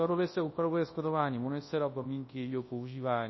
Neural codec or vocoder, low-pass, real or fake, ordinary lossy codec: codec, 24 kHz, 0.9 kbps, WavTokenizer, large speech release; 7.2 kHz; fake; MP3, 24 kbps